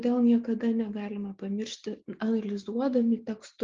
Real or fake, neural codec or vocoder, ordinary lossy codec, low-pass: real; none; Opus, 32 kbps; 7.2 kHz